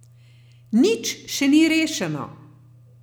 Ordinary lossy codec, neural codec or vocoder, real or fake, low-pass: none; none; real; none